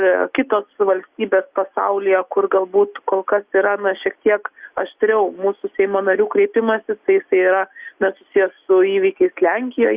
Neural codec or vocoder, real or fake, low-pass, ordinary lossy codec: none; real; 3.6 kHz; Opus, 64 kbps